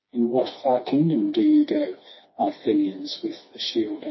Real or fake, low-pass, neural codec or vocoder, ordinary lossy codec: fake; 7.2 kHz; codec, 16 kHz, 2 kbps, FreqCodec, smaller model; MP3, 24 kbps